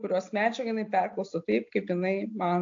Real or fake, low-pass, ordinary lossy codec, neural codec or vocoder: real; 7.2 kHz; AAC, 48 kbps; none